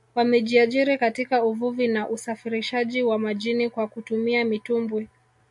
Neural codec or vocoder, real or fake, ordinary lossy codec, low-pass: none; real; MP3, 64 kbps; 10.8 kHz